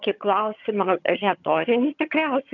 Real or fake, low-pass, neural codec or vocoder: fake; 7.2 kHz; vocoder, 22.05 kHz, 80 mel bands, HiFi-GAN